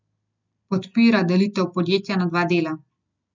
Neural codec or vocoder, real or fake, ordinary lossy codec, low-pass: none; real; none; 7.2 kHz